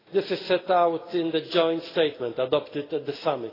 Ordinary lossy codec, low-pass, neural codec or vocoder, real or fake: AAC, 24 kbps; 5.4 kHz; none; real